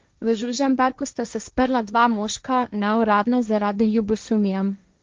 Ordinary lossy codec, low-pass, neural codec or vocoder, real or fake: Opus, 32 kbps; 7.2 kHz; codec, 16 kHz, 1.1 kbps, Voila-Tokenizer; fake